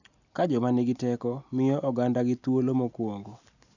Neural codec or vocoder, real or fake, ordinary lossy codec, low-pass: none; real; none; 7.2 kHz